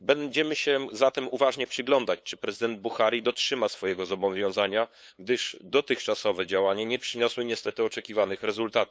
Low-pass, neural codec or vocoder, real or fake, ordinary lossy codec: none; codec, 16 kHz, 8 kbps, FunCodec, trained on LibriTTS, 25 frames a second; fake; none